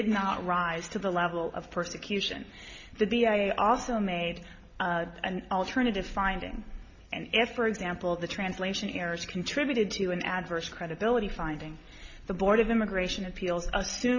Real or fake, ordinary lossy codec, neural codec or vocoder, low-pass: real; MP3, 48 kbps; none; 7.2 kHz